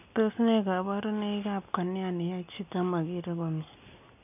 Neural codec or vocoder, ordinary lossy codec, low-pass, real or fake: none; none; 3.6 kHz; real